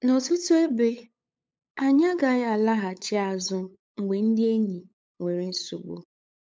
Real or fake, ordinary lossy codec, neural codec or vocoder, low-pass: fake; none; codec, 16 kHz, 8 kbps, FunCodec, trained on LibriTTS, 25 frames a second; none